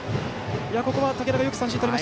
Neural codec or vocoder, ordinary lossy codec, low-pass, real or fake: none; none; none; real